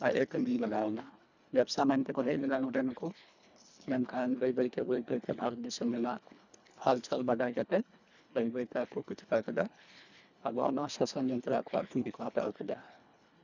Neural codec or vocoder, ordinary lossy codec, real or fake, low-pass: codec, 24 kHz, 1.5 kbps, HILCodec; none; fake; 7.2 kHz